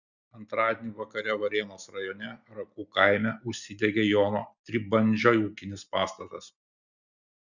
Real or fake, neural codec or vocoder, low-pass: real; none; 7.2 kHz